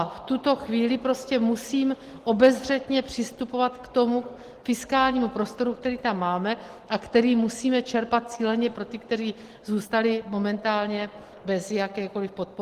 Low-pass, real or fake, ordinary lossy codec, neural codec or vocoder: 14.4 kHz; real; Opus, 16 kbps; none